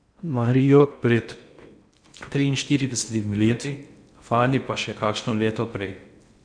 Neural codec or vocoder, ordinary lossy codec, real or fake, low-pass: codec, 16 kHz in and 24 kHz out, 0.6 kbps, FocalCodec, streaming, 2048 codes; none; fake; 9.9 kHz